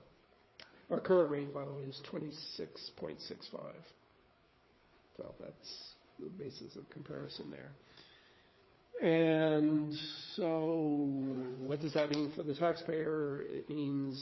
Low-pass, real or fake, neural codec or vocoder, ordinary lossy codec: 7.2 kHz; fake; codec, 16 kHz, 4 kbps, FreqCodec, larger model; MP3, 24 kbps